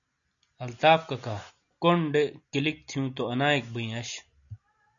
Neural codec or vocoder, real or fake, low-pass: none; real; 7.2 kHz